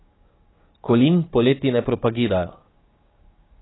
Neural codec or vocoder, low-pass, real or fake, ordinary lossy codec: codec, 16 kHz, 6 kbps, DAC; 7.2 kHz; fake; AAC, 16 kbps